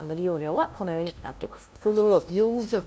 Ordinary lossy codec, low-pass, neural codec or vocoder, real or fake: none; none; codec, 16 kHz, 0.5 kbps, FunCodec, trained on LibriTTS, 25 frames a second; fake